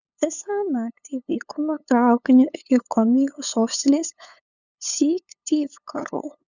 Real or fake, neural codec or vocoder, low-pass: fake; codec, 16 kHz, 8 kbps, FunCodec, trained on LibriTTS, 25 frames a second; 7.2 kHz